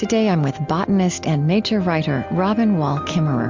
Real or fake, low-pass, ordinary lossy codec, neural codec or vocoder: real; 7.2 kHz; MP3, 64 kbps; none